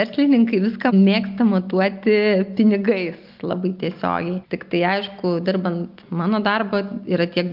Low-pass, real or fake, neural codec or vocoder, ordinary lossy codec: 5.4 kHz; real; none; Opus, 24 kbps